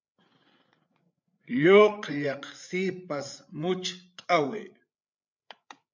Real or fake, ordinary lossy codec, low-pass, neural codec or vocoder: fake; MP3, 64 kbps; 7.2 kHz; codec, 16 kHz, 8 kbps, FreqCodec, larger model